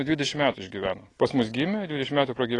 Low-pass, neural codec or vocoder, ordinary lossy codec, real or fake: 10.8 kHz; none; AAC, 32 kbps; real